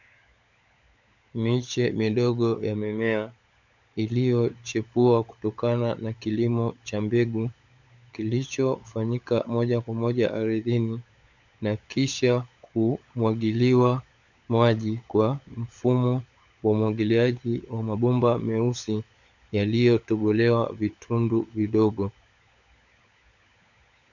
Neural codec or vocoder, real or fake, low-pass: codec, 16 kHz, 16 kbps, FunCodec, trained on LibriTTS, 50 frames a second; fake; 7.2 kHz